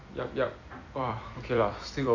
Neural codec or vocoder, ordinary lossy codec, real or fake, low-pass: vocoder, 44.1 kHz, 128 mel bands every 256 samples, BigVGAN v2; AAC, 48 kbps; fake; 7.2 kHz